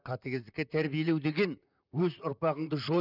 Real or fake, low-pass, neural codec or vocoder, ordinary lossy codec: fake; 5.4 kHz; vocoder, 44.1 kHz, 128 mel bands, Pupu-Vocoder; none